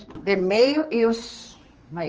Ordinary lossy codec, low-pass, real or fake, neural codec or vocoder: Opus, 24 kbps; 7.2 kHz; fake; codec, 16 kHz, 2 kbps, X-Codec, HuBERT features, trained on general audio